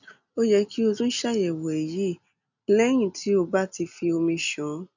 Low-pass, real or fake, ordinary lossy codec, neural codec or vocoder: 7.2 kHz; real; none; none